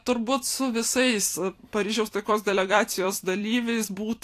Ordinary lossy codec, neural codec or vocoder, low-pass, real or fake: AAC, 64 kbps; vocoder, 44.1 kHz, 128 mel bands every 256 samples, BigVGAN v2; 14.4 kHz; fake